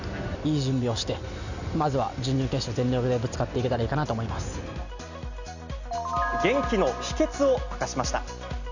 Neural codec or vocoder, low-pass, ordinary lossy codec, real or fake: none; 7.2 kHz; none; real